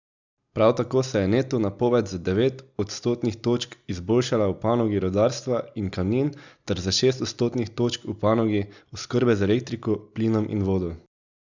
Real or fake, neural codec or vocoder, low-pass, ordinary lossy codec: real; none; 7.2 kHz; none